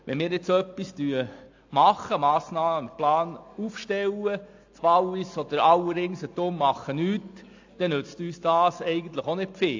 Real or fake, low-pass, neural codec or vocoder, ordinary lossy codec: real; 7.2 kHz; none; AAC, 48 kbps